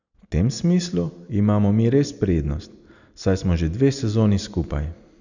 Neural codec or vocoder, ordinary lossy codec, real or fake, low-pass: none; none; real; 7.2 kHz